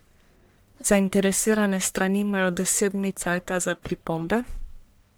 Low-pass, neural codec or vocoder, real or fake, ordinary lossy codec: none; codec, 44.1 kHz, 1.7 kbps, Pupu-Codec; fake; none